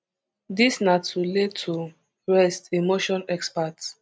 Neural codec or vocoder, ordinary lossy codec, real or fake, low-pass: none; none; real; none